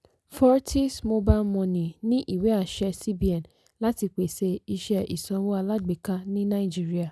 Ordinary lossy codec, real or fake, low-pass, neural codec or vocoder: none; real; none; none